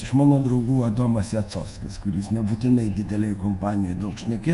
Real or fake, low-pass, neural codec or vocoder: fake; 10.8 kHz; codec, 24 kHz, 1.2 kbps, DualCodec